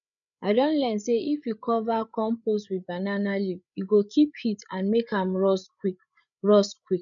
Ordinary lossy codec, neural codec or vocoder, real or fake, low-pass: none; codec, 16 kHz, 8 kbps, FreqCodec, larger model; fake; 7.2 kHz